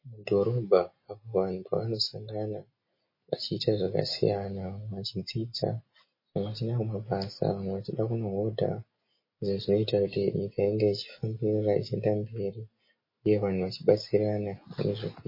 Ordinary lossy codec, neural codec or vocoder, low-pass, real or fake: MP3, 24 kbps; none; 5.4 kHz; real